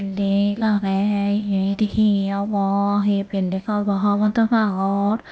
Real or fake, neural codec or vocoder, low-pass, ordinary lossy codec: fake; codec, 16 kHz, 0.8 kbps, ZipCodec; none; none